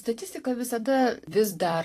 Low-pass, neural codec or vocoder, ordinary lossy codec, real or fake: 14.4 kHz; vocoder, 44.1 kHz, 128 mel bands every 256 samples, BigVGAN v2; AAC, 48 kbps; fake